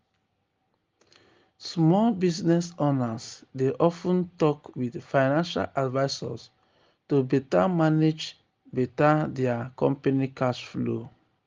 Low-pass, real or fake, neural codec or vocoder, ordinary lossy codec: 7.2 kHz; real; none; Opus, 32 kbps